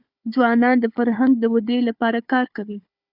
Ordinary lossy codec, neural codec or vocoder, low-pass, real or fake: Opus, 64 kbps; codec, 16 kHz, 4 kbps, FunCodec, trained on Chinese and English, 50 frames a second; 5.4 kHz; fake